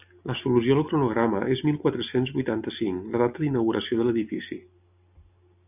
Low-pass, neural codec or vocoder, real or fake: 3.6 kHz; none; real